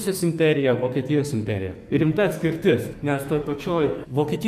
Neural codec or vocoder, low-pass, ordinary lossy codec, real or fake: codec, 44.1 kHz, 2.6 kbps, SNAC; 14.4 kHz; MP3, 96 kbps; fake